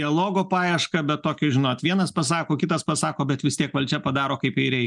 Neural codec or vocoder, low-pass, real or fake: none; 10.8 kHz; real